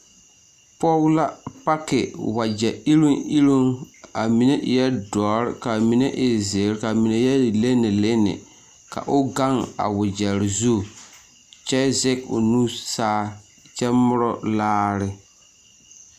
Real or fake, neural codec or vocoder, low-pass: real; none; 14.4 kHz